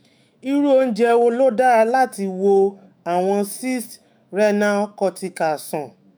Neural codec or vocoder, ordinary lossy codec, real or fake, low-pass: autoencoder, 48 kHz, 128 numbers a frame, DAC-VAE, trained on Japanese speech; none; fake; none